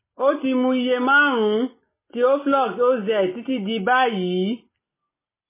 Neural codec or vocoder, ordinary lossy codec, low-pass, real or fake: none; MP3, 16 kbps; 3.6 kHz; real